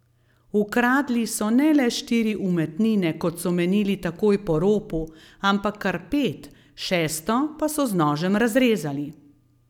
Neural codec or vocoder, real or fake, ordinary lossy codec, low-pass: none; real; none; 19.8 kHz